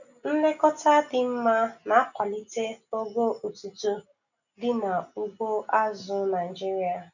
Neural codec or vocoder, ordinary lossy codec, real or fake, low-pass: none; none; real; 7.2 kHz